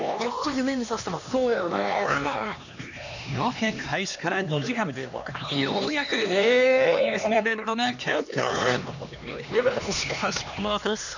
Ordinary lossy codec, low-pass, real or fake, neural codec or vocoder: none; 7.2 kHz; fake; codec, 16 kHz, 2 kbps, X-Codec, HuBERT features, trained on LibriSpeech